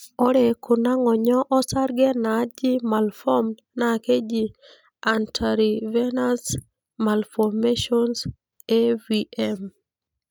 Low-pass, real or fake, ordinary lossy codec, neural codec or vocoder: none; real; none; none